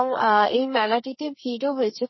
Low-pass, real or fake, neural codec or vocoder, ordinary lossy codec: 7.2 kHz; fake; codec, 32 kHz, 1.9 kbps, SNAC; MP3, 24 kbps